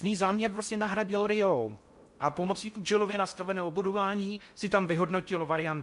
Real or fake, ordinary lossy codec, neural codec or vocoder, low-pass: fake; MP3, 64 kbps; codec, 16 kHz in and 24 kHz out, 0.6 kbps, FocalCodec, streaming, 4096 codes; 10.8 kHz